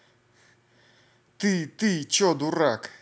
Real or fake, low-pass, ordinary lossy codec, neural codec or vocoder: real; none; none; none